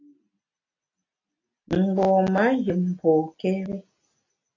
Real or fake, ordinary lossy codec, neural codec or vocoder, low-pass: real; AAC, 32 kbps; none; 7.2 kHz